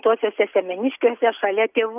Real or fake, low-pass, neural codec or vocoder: real; 3.6 kHz; none